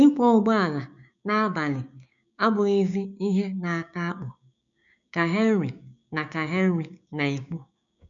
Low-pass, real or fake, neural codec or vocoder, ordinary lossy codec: 7.2 kHz; fake; codec, 16 kHz, 6 kbps, DAC; none